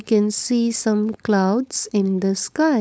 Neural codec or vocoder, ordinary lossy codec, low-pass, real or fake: codec, 16 kHz, 4.8 kbps, FACodec; none; none; fake